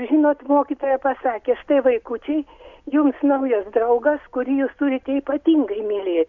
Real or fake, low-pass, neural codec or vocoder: real; 7.2 kHz; none